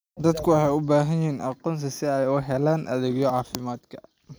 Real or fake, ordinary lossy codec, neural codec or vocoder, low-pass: real; none; none; none